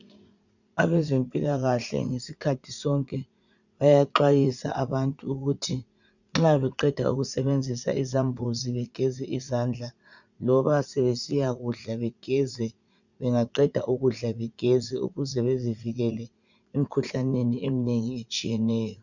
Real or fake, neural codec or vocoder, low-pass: fake; vocoder, 44.1 kHz, 80 mel bands, Vocos; 7.2 kHz